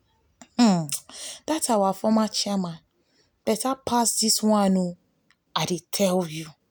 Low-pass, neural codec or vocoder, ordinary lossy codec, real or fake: none; none; none; real